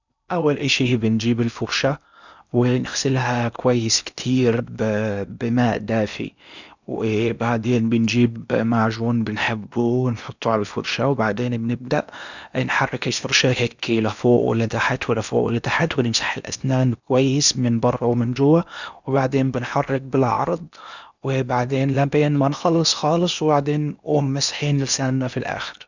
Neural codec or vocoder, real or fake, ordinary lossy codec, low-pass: codec, 16 kHz in and 24 kHz out, 0.8 kbps, FocalCodec, streaming, 65536 codes; fake; none; 7.2 kHz